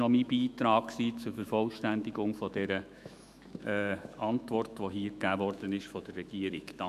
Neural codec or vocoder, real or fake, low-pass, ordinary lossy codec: autoencoder, 48 kHz, 128 numbers a frame, DAC-VAE, trained on Japanese speech; fake; 14.4 kHz; none